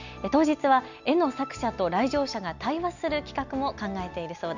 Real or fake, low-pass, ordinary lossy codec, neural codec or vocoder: real; 7.2 kHz; none; none